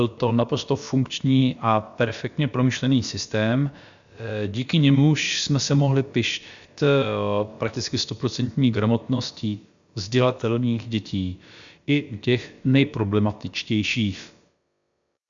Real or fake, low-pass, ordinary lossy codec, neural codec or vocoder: fake; 7.2 kHz; Opus, 64 kbps; codec, 16 kHz, about 1 kbps, DyCAST, with the encoder's durations